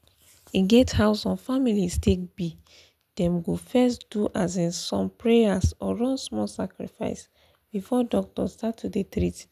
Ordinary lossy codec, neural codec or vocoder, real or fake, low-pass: none; none; real; 14.4 kHz